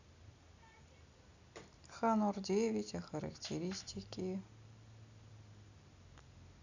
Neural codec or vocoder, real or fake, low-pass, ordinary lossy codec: none; real; 7.2 kHz; none